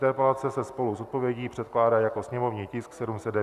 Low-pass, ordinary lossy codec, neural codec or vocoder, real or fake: 14.4 kHz; Opus, 64 kbps; none; real